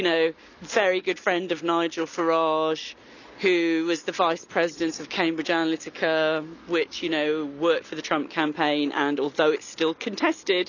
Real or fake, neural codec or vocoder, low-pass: real; none; 7.2 kHz